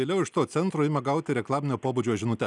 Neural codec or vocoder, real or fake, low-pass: none; real; 10.8 kHz